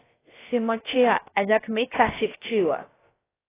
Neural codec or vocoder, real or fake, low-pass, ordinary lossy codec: codec, 16 kHz, about 1 kbps, DyCAST, with the encoder's durations; fake; 3.6 kHz; AAC, 16 kbps